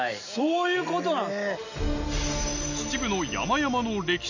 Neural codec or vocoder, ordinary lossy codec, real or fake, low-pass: none; none; real; 7.2 kHz